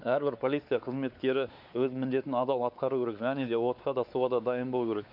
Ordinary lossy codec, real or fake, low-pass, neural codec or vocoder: MP3, 48 kbps; fake; 5.4 kHz; codec, 16 kHz, 4 kbps, X-Codec, WavLM features, trained on Multilingual LibriSpeech